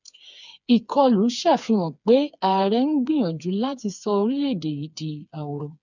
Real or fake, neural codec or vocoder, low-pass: fake; codec, 16 kHz, 4 kbps, FreqCodec, smaller model; 7.2 kHz